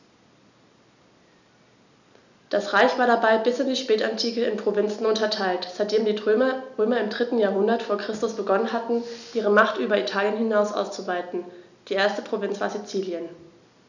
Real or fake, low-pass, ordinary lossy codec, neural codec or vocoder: real; 7.2 kHz; none; none